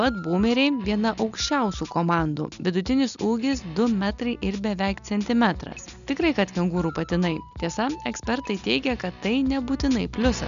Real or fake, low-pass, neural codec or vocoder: real; 7.2 kHz; none